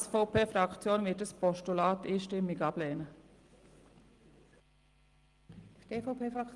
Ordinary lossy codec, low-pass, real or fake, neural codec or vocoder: Opus, 24 kbps; 10.8 kHz; real; none